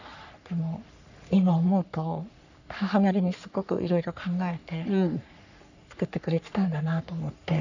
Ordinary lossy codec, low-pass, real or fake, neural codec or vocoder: none; 7.2 kHz; fake; codec, 44.1 kHz, 3.4 kbps, Pupu-Codec